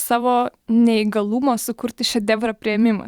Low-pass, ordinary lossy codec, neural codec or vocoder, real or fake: 19.8 kHz; Opus, 64 kbps; vocoder, 44.1 kHz, 128 mel bands every 512 samples, BigVGAN v2; fake